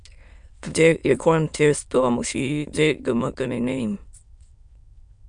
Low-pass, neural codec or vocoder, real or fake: 9.9 kHz; autoencoder, 22.05 kHz, a latent of 192 numbers a frame, VITS, trained on many speakers; fake